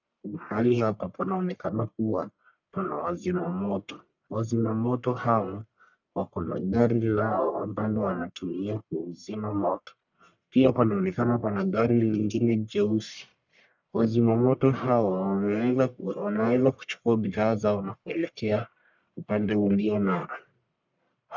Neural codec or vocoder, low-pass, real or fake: codec, 44.1 kHz, 1.7 kbps, Pupu-Codec; 7.2 kHz; fake